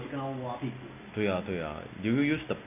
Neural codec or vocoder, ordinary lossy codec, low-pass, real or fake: none; none; 3.6 kHz; real